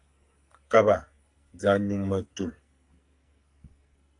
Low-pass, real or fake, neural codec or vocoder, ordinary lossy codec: 10.8 kHz; fake; codec, 44.1 kHz, 2.6 kbps, SNAC; AAC, 64 kbps